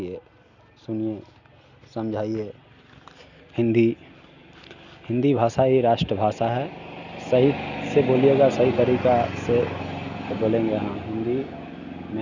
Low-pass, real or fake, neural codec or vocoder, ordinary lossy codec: 7.2 kHz; real; none; none